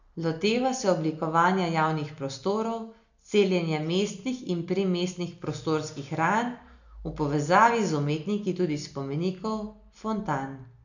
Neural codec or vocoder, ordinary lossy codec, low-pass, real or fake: none; none; 7.2 kHz; real